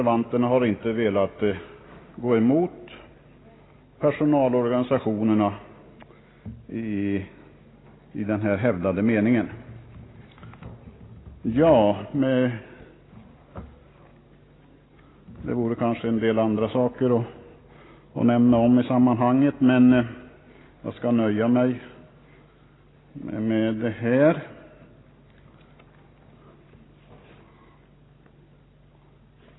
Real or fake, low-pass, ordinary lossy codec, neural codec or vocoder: real; 7.2 kHz; AAC, 16 kbps; none